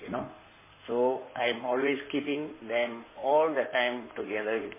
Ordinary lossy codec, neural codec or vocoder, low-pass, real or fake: MP3, 16 kbps; codec, 16 kHz in and 24 kHz out, 2.2 kbps, FireRedTTS-2 codec; 3.6 kHz; fake